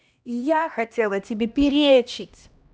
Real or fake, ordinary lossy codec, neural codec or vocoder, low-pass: fake; none; codec, 16 kHz, 1 kbps, X-Codec, HuBERT features, trained on LibriSpeech; none